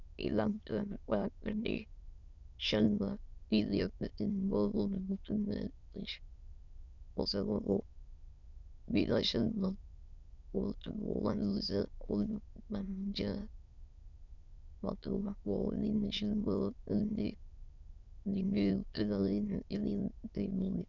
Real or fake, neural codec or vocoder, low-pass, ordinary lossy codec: fake; autoencoder, 22.05 kHz, a latent of 192 numbers a frame, VITS, trained on many speakers; 7.2 kHz; Opus, 64 kbps